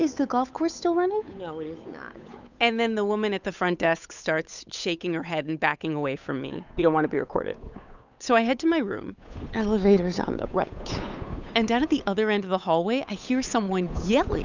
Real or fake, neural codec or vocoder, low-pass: fake; codec, 16 kHz, 8 kbps, FunCodec, trained on Chinese and English, 25 frames a second; 7.2 kHz